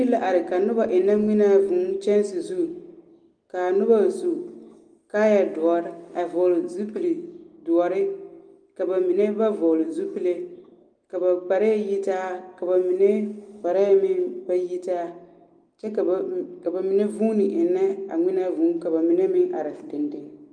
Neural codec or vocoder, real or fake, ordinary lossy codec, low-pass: none; real; Opus, 32 kbps; 9.9 kHz